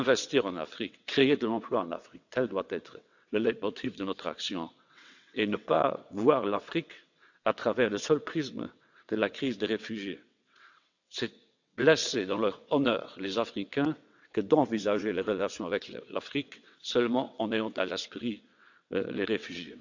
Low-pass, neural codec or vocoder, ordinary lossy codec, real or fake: 7.2 kHz; vocoder, 22.05 kHz, 80 mel bands, WaveNeXt; none; fake